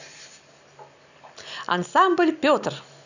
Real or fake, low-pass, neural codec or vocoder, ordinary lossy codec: real; 7.2 kHz; none; none